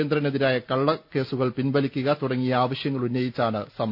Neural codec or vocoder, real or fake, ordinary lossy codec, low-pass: none; real; none; 5.4 kHz